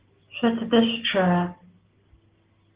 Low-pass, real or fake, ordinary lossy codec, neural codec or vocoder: 3.6 kHz; real; Opus, 16 kbps; none